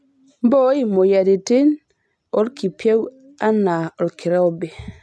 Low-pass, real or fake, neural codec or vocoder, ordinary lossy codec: none; real; none; none